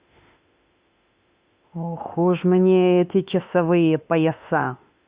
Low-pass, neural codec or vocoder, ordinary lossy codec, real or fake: 3.6 kHz; autoencoder, 48 kHz, 32 numbers a frame, DAC-VAE, trained on Japanese speech; Opus, 64 kbps; fake